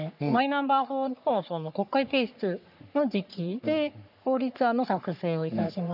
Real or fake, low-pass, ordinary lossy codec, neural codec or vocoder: fake; 5.4 kHz; none; codec, 44.1 kHz, 3.4 kbps, Pupu-Codec